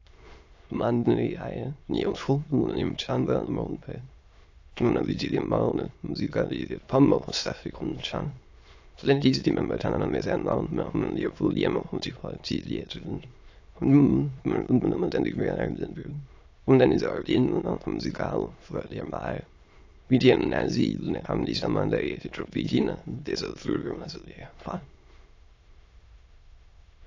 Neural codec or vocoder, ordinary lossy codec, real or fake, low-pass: autoencoder, 22.05 kHz, a latent of 192 numbers a frame, VITS, trained on many speakers; AAC, 48 kbps; fake; 7.2 kHz